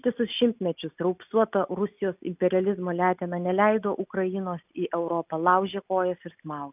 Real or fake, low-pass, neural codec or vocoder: real; 3.6 kHz; none